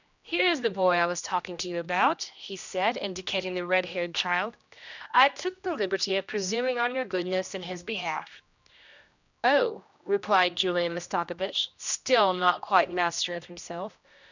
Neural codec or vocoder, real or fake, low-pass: codec, 16 kHz, 1 kbps, X-Codec, HuBERT features, trained on general audio; fake; 7.2 kHz